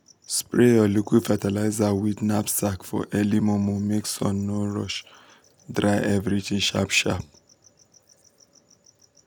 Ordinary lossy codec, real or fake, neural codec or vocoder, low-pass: none; real; none; none